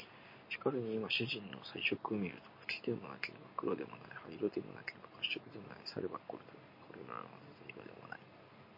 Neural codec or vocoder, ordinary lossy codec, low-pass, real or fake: none; MP3, 32 kbps; 5.4 kHz; real